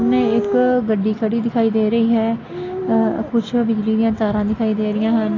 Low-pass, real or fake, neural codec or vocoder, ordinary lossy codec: 7.2 kHz; real; none; AAC, 32 kbps